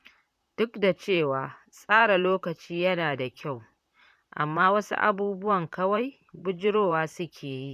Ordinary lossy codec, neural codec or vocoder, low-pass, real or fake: none; vocoder, 44.1 kHz, 128 mel bands, Pupu-Vocoder; 14.4 kHz; fake